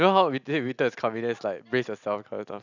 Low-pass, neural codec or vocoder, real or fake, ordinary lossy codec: 7.2 kHz; none; real; none